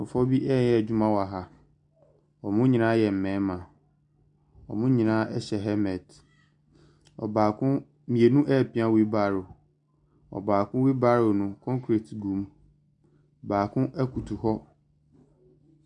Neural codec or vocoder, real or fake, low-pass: none; real; 10.8 kHz